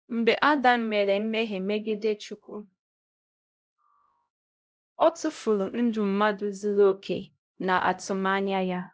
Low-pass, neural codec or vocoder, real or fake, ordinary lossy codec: none; codec, 16 kHz, 0.5 kbps, X-Codec, HuBERT features, trained on LibriSpeech; fake; none